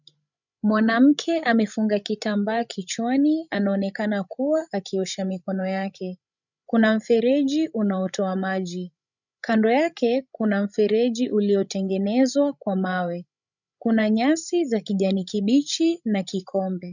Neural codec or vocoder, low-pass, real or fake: codec, 16 kHz, 16 kbps, FreqCodec, larger model; 7.2 kHz; fake